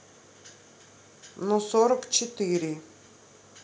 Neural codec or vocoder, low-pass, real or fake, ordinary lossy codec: none; none; real; none